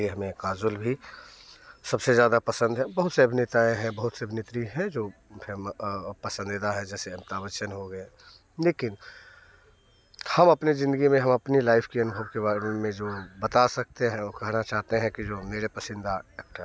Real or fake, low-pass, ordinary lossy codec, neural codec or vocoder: real; none; none; none